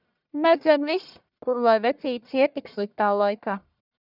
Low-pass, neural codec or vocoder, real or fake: 5.4 kHz; codec, 44.1 kHz, 1.7 kbps, Pupu-Codec; fake